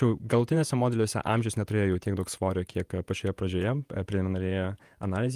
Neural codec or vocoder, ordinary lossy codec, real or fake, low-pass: vocoder, 48 kHz, 128 mel bands, Vocos; Opus, 32 kbps; fake; 14.4 kHz